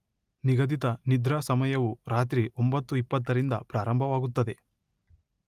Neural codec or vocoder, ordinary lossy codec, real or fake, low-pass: none; Opus, 32 kbps; real; 14.4 kHz